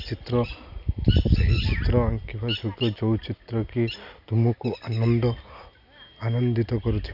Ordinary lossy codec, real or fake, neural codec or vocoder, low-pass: none; real; none; 5.4 kHz